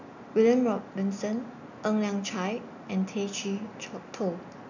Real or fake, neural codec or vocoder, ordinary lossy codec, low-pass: real; none; none; 7.2 kHz